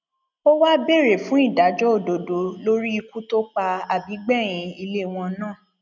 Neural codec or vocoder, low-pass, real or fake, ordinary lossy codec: none; 7.2 kHz; real; none